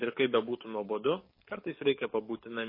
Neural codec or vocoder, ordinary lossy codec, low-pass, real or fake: codec, 44.1 kHz, 7.8 kbps, DAC; MP3, 24 kbps; 5.4 kHz; fake